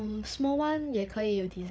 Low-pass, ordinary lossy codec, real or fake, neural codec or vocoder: none; none; fake; codec, 16 kHz, 8 kbps, FreqCodec, larger model